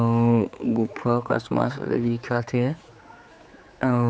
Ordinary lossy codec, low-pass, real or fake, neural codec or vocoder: none; none; fake; codec, 16 kHz, 4 kbps, X-Codec, HuBERT features, trained on general audio